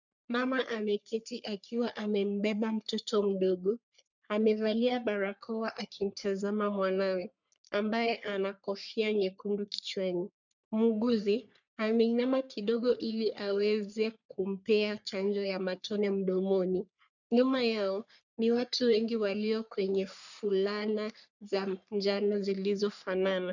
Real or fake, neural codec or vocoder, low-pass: fake; codec, 44.1 kHz, 3.4 kbps, Pupu-Codec; 7.2 kHz